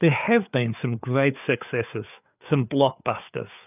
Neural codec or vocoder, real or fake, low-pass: codec, 16 kHz, 4 kbps, FreqCodec, larger model; fake; 3.6 kHz